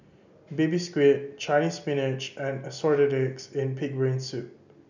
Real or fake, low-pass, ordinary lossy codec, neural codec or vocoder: real; 7.2 kHz; none; none